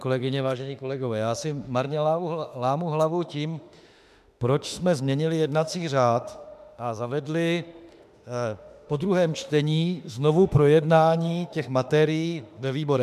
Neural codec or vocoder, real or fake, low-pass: autoencoder, 48 kHz, 32 numbers a frame, DAC-VAE, trained on Japanese speech; fake; 14.4 kHz